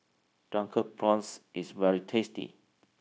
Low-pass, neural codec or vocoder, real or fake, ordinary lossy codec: none; codec, 16 kHz, 0.9 kbps, LongCat-Audio-Codec; fake; none